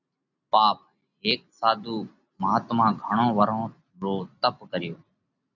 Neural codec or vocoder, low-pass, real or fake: none; 7.2 kHz; real